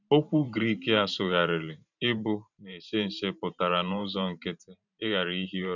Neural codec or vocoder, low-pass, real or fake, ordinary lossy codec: vocoder, 44.1 kHz, 128 mel bands every 512 samples, BigVGAN v2; 7.2 kHz; fake; none